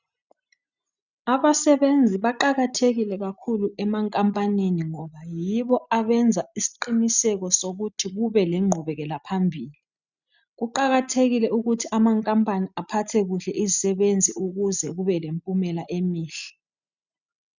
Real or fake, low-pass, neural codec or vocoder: real; 7.2 kHz; none